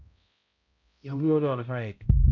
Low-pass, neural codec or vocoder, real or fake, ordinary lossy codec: 7.2 kHz; codec, 16 kHz, 0.5 kbps, X-Codec, HuBERT features, trained on balanced general audio; fake; none